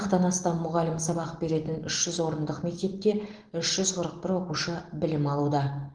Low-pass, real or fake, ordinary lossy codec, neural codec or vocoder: 9.9 kHz; real; Opus, 16 kbps; none